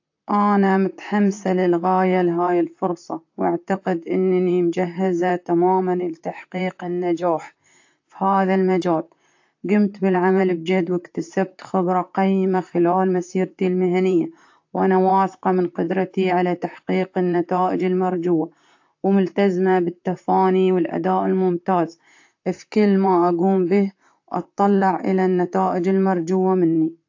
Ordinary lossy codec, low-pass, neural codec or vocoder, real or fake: AAC, 48 kbps; 7.2 kHz; vocoder, 44.1 kHz, 128 mel bands, Pupu-Vocoder; fake